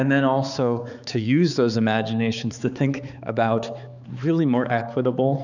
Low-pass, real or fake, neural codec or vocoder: 7.2 kHz; fake; codec, 16 kHz, 4 kbps, X-Codec, HuBERT features, trained on balanced general audio